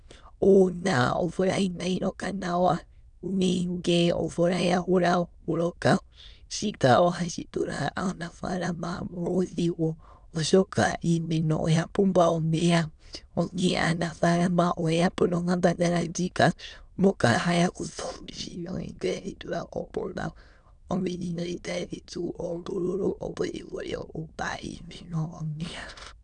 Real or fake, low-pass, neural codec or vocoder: fake; 9.9 kHz; autoencoder, 22.05 kHz, a latent of 192 numbers a frame, VITS, trained on many speakers